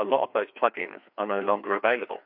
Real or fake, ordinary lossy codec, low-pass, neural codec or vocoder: fake; MP3, 48 kbps; 5.4 kHz; codec, 16 kHz, 2 kbps, FreqCodec, larger model